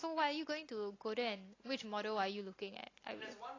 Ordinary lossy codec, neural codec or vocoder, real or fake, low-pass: AAC, 32 kbps; none; real; 7.2 kHz